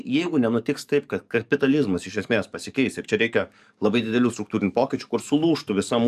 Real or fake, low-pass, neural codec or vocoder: fake; 14.4 kHz; codec, 44.1 kHz, 7.8 kbps, DAC